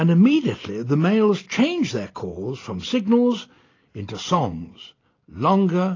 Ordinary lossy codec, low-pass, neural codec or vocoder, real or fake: AAC, 32 kbps; 7.2 kHz; none; real